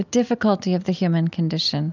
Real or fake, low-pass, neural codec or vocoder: real; 7.2 kHz; none